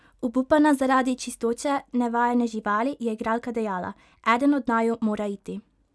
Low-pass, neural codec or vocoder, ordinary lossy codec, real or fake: none; none; none; real